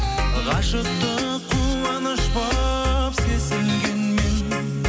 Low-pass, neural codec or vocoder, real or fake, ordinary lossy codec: none; none; real; none